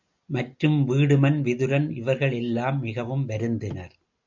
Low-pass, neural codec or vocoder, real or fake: 7.2 kHz; none; real